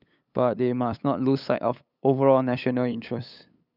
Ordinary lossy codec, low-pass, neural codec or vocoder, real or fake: none; 5.4 kHz; codec, 16 kHz, 8 kbps, FunCodec, trained on LibriTTS, 25 frames a second; fake